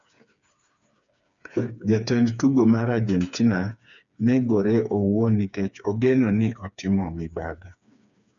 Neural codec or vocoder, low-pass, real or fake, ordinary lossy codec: codec, 16 kHz, 4 kbps, FreqCodec, smaller model; 7.2 kHz; fake; none